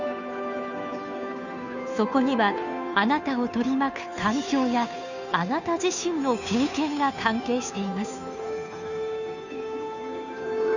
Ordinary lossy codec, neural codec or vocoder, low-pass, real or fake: none; codec, 16 kHz, 2 kbps, FunCodec, trained on Chinese and English, 25 frames a second; 7.2 kHz; fake